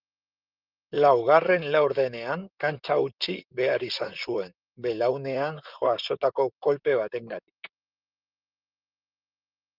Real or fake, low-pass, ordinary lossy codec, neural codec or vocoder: fake; 5.4 kHz; Opus, 24 kbps; vocoder, 44.1 kHz, 128 mel bands, Pupu-Vocoder